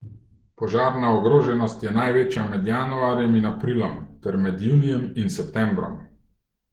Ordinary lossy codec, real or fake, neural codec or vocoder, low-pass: Opus, 16 kbps; fake; autoencoder, 48 kHz, 128 numbers a frame, DAC-VAE, trained on Japanese speech; 19.8 kHz